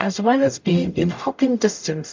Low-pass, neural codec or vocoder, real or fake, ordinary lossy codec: 7.2 kHz; codec, 44.1 kHz, 0.9 kbps, DAC; fake; MP3, 64 kbps